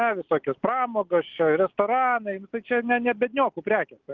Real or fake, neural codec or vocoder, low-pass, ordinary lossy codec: real; none; 7.2 kHz; Opus, 32 kbps